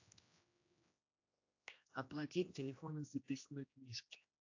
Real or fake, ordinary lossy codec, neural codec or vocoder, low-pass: fake; AAC, 48 kbps; codec, 16 kHz, 1 kbps, X-Codec, HuBERT features, trained on general audio; 7.2 kHz